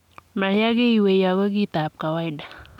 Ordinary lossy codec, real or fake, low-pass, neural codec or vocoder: none; real; 19.8 kHz; none